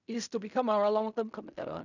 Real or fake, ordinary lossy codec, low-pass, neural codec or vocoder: fake; none; 7.2 kHz; codec, 16 kHz in and 24 kHz out, 0.4 kbps, LongCat-Audio-Codec, fine tuned four codebook decoder